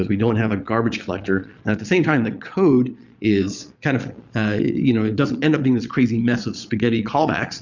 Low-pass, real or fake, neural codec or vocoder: 7.2 kHz; fake; vocoder, 22.05 kHz, 80 mel bands, Vocos